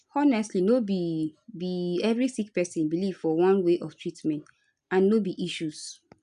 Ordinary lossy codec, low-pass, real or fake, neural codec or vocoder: none; 10.8 kHz; real; none